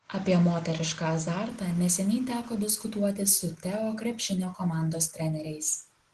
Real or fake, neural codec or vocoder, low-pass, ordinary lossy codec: real; none; 10.8 kHz; Opus, 16 kbps